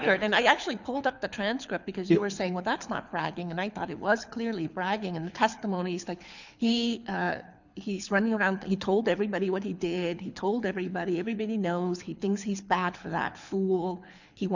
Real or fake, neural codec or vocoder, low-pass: fake; codec, 24 kHz, 6 kbps, HILCodec; 7.2 kHz